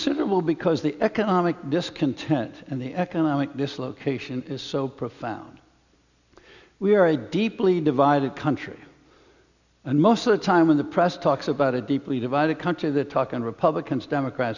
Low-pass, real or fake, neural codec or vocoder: 7.2 kHz; real; none